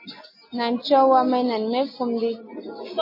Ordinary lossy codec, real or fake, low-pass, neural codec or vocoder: MP3, 24 kbps; real; 5.4 kHz; none